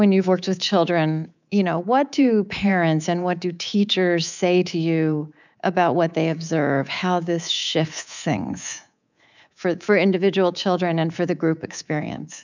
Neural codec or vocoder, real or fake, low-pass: codec, 24 kHz, 3.1 kbps, DualCodec; fake; 7.2 kHz